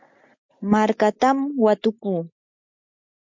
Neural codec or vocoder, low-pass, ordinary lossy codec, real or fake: none; 7.2 kHz; MP3, 64 kbps; real